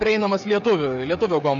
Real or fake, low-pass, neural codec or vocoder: fake; 7.2 kHz; codec, 16 kHz, 16 kbps, FreqCodec, smaller model